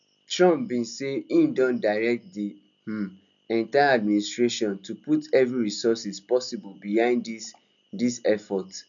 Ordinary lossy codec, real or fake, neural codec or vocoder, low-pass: none; real; none; 7.2 kHz